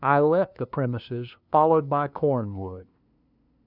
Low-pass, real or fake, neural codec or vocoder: 5.4 kHz; fake; codec, 16 kHz, 2 kbps, FunCodec, trained on LibriTTS, 25 frames a second